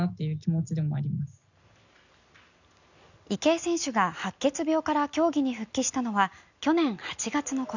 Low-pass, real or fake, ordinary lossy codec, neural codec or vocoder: 7.2 kHz; real; none; none